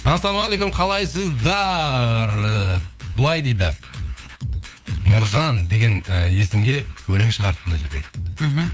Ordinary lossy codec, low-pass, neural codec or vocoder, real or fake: none; none; codec, 16 kHz, 2 kbps, FunCodec, trained on LibriTTS, 25 frames a second; fake